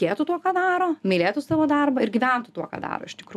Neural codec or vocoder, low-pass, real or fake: none; 14.4 kHz; real